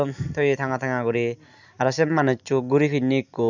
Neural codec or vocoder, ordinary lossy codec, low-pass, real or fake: none; none; 7.2 kHz; real